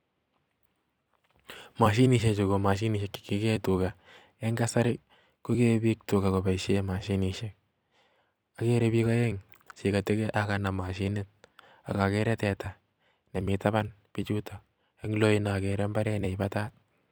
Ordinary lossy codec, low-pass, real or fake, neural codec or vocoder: none; none; fake; vocoder, 44.1 kHz, 128 mel bands every 256 samples, BigVGAN v2